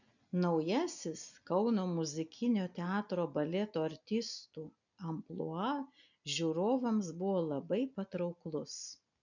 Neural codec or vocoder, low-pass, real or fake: none; 7.2 kHz; real